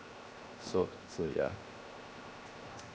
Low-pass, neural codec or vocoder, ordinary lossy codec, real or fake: none; codec, 16 kHz, 0.7 kbps, FocalCodec; none; fake